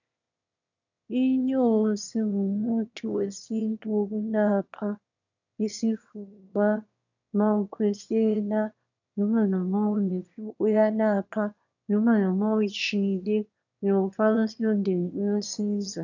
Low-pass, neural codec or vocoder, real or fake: 7.2 kHz; autoencoder, 22.05 kHz, a latent of 192 numbers a frame, VITS, trained on one speaker; fake